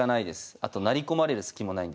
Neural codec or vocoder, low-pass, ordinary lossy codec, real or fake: none; none; none; real